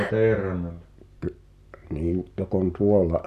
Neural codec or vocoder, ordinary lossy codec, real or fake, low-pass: none; none; real; 14.4 kHz